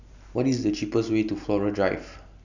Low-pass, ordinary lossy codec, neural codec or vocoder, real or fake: 7.2 kHz; none; none; real